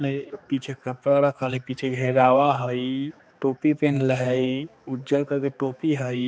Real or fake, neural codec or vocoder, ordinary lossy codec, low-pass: fake; codec, 16 kHz, 2 kbps, X-Codec, HuBERT features, trained on balanced general audio; none; none